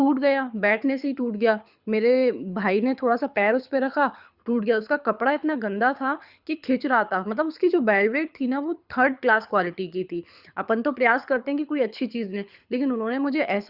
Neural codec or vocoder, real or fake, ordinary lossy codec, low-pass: codec, 24 kHz, 6 kbps, HILCodec; fake; Opus, 64 kbps; 5.4 kHz